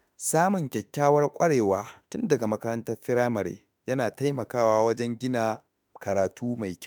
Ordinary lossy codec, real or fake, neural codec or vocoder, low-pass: none; fake; autoencoder, 48 kHz, 32 numbers a frame, DAC-VAE, trained on Japanese speech; none